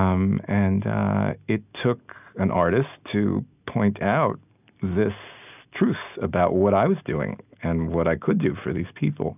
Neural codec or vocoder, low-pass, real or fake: none; 3.6 kHz; real